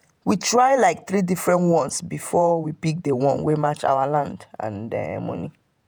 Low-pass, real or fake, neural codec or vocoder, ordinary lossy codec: none; fake; vocoder, 48 kHz, 128 mel bands, Vocos; none